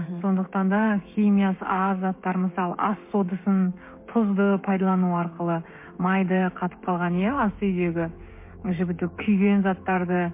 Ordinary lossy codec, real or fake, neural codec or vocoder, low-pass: MP3, 24 kbps; fake; codec, 16 kHz, 16 kbps, FreqCodec, smaller model; 3.6 kHz